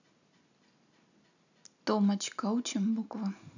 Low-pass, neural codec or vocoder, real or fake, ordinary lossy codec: 7.2 kHz; none; real; none